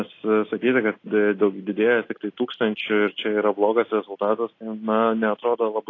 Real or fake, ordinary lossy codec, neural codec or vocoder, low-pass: real; AAC, 32 kbps; none; 7.2 kHz